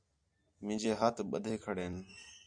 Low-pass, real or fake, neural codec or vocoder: 9.9 kHz; real; none